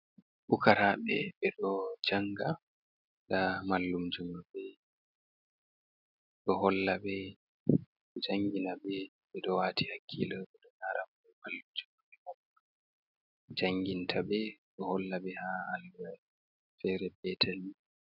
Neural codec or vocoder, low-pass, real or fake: none; 5.4 kHz; real